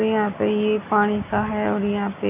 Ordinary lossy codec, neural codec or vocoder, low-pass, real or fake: none; none; 3.6 kHz; real